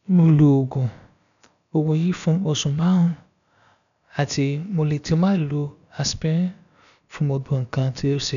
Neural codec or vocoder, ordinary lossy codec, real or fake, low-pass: codec, 16 kHz, about 1 kbps, DyCAST, with the encoder's durations; none; fake; 7.2 kHz